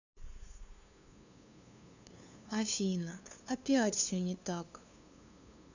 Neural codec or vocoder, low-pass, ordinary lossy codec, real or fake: codec, 16 kHz, 2 kbps, FunCodec, trained on LibriTTS, 25 frames a second; 7.2 kHz; none; fake